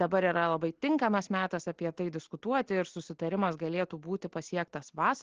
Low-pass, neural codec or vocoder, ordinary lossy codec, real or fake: 7.2 kHz; none; Opus, 16 kbps; real